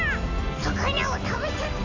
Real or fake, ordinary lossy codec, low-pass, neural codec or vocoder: real; AAC, 48 kbps; 7.2 kHz; none